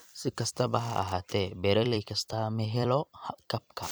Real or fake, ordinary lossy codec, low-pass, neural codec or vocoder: fake; none; none; vocoder, 44.1 kHz, 128 mel bands every 256 samples, BigVGAN v2